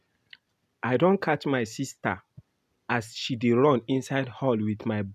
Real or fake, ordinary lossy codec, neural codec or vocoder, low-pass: real; none; none; 14.4 kHz